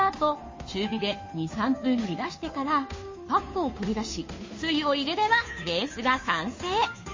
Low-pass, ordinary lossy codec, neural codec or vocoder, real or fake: 7.2 kHz; MP3, 32 kbps; codec, 16 kHz, 2 kbps, FunCodec, trained on Chinese and English, 25 frames a second; fake